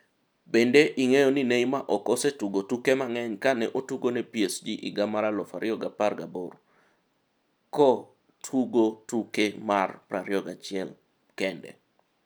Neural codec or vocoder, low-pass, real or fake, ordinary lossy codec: none; none; real; none